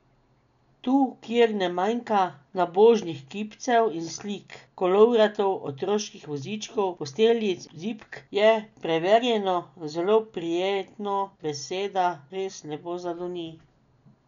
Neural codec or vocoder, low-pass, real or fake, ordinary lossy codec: none; 7.2 kHz; real; none